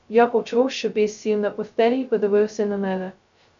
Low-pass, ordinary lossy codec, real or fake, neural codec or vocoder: 7.2 kHz; MP3, 64 kbps; fake; codec, 16 kHz, 0.2 kbps, FocalCodec